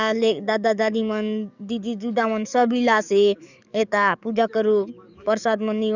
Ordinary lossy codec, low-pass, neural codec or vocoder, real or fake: none; 7.2 kHz; codec, 44.1 kHz, 7.8 kbps, DAC; fake